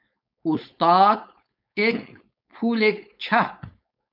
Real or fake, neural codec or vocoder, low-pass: fake; codec, 16 kHz, 4.8 kbps, FACodec; 5.4 kHz